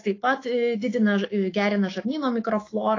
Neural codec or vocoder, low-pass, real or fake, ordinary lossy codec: autoencoder, 48 kHz, 128 numbers a frame, DAC-VAE, trained on Japanese speech; 7.2 kHz; fake; AAC, 32 kbps